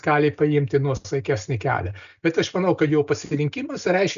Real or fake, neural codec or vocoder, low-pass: real; none; 7.2 kHz